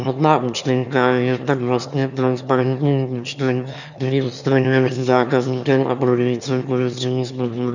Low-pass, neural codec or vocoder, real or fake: 7.2 kHz; autoencoder, 22.05 kHz, a latent of 192 numbers a frame, VITS, trained on one speaker; fake